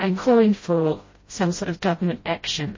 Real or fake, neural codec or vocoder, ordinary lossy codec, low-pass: fake; codec, 16 kHz, 0.5 kbps, FreqCodec, smaller model; MP3, 32 kbps; 7.2 kHz